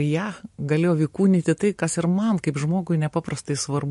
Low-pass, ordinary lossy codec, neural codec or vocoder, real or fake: 14.4 kHz; MP3, 48 kbps; none; real